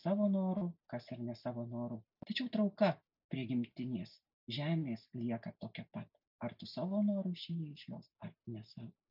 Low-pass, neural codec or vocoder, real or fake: 5.4 kHz; none; real